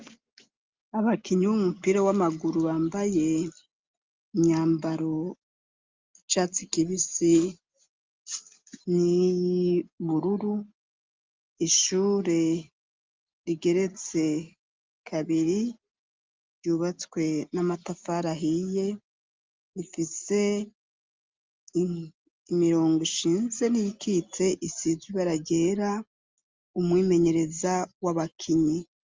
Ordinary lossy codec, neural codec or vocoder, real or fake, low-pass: Opus, 32 kbps; none; real; 7.2 kHz